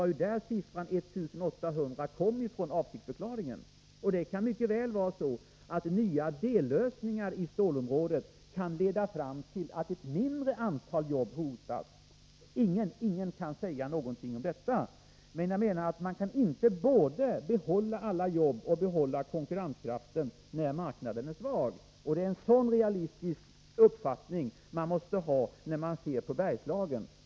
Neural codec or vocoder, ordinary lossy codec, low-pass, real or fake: none; none; none; real